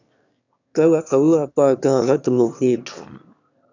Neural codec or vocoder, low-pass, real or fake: autoencoder, 22.05 kHz, a latent of 192 numbers a frame, VITS, trained on one speaker; 7.2 kHz; fake